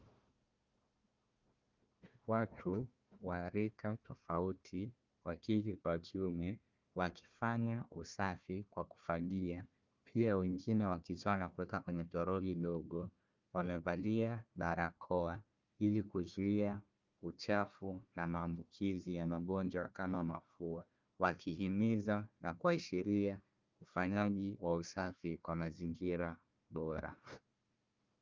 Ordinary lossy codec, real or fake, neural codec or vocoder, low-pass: Opus, 24 kbps; fake; codec, 16 kHz, 1 kbps, FunCodec, trained on Chinese and English, 50 frames a second; 7.2 kHz